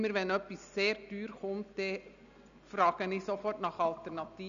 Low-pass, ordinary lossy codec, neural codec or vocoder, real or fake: 7.2 kHz; none; none; real